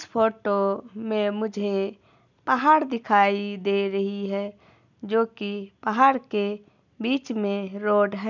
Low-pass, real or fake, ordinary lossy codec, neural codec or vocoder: 7.2 kHz; real; none; none